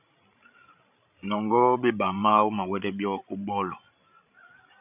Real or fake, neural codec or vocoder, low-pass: fake; codec, 16 kHz, 16 kbps, FreqCodec, larger model; 3.6 kHz